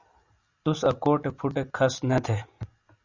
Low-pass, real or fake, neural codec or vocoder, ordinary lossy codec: 7.2 kHz; real; none; Opus, 64 kbps